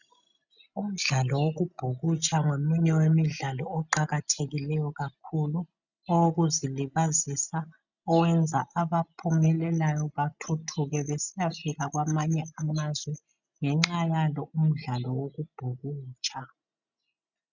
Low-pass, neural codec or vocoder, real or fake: 7.2 kHz; none; real